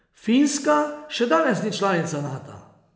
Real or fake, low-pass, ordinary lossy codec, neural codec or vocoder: real; none; none; none